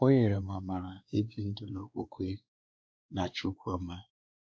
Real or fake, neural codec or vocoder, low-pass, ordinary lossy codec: fake; codec, 16 kHz, 2 kbps, X-Codec, WavLM features, trained on Multilingual LibriSpeech; none; none